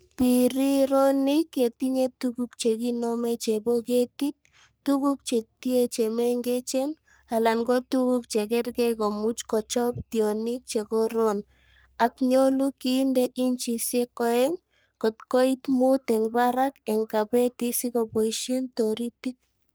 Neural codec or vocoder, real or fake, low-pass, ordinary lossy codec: codec, 44.1 kHz, 3.4 kbps, Pupu-Codec; fake; none; none